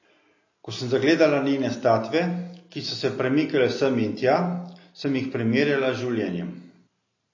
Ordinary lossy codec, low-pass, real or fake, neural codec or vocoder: MP3, 32 kbps; 7.2 kHz; real; none